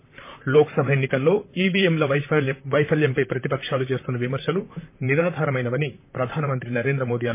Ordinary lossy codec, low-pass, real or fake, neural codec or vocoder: MP3, 24 kbps; 3.6 kHz; fake; vocoder, 44.1 kHz, 128 mel bands, Pupu-Vocoder